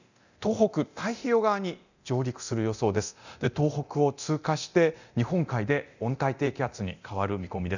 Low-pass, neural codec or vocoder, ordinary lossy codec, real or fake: 7.2 kHz; codec, 24 kHz, 0.9 kbps, DualCodec; none; fake